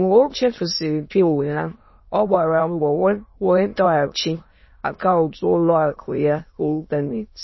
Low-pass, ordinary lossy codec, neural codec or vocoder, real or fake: 7.2 kHz; MP3, 24 kbps; autoencoder, 22.05 kHz, a latent of 192 numbers a frame, VITS, trained on many speakers; fake